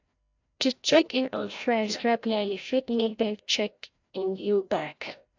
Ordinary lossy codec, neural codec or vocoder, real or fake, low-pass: AAC, 48 kbps; codec, 16 kHz, 0.5 kbps, FreqCodec, larger model; fake; 7.2 kHz